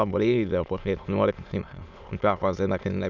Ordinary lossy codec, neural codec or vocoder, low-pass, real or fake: none; autoencoder, 22.05 kHz, a latent of 192 numbers a frame, VITS, trained on many speakers; 7.2 kHz; fake